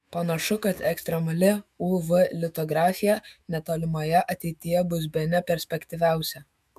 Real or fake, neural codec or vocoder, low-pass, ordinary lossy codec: fake; autoencoder, 48 kHz, 128 numbers a frame, DAC-VAE, trained on Japanese speech; 14.4 kHz; MP3, 96 kbps